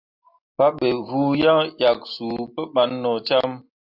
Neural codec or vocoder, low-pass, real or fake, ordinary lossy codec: none; 5.4 kHz; real; AAC, 48 kbps